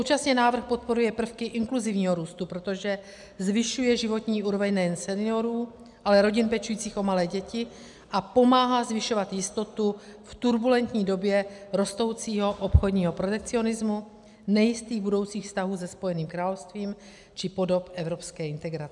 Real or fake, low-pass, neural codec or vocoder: real; 10.8 kHz; none